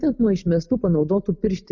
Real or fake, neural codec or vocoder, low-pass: real; none; 7.2 kHz